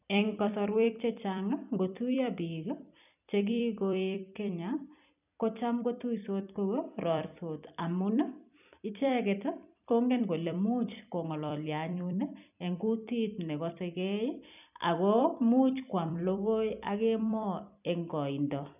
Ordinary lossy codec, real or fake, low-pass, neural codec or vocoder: none; fake; 3.6 kHz; vocoder, 44.1 kHz, 128 mel bands every 256 samples, BigVGAN v2